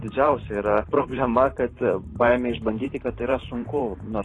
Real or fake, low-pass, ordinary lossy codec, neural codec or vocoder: fake; 10.8 kHz; AAC, 32 kbps; vocoder, 44.1 kHz, 128 mel bands every 512 samples, BigVGAN v2